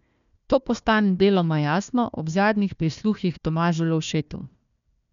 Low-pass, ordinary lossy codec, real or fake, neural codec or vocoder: 7.2 kHz; none; fake; codec, 16 kHz, 1 kbps, FunCodec, trained on Chinese and English, 50 frames a second